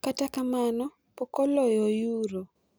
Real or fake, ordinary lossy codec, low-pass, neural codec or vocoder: real; none; none; none